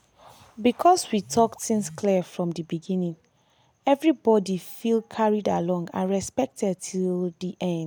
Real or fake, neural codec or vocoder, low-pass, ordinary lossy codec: real; none; none; none